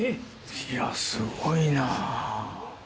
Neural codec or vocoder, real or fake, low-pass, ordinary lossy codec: none; real; none; none